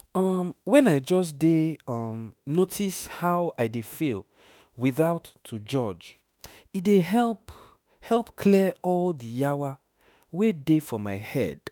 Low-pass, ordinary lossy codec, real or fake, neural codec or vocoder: none; none; fake; autoencoder, 48 kHz, 32 numbers a frame, DAC-VAE, trained on Japanese speech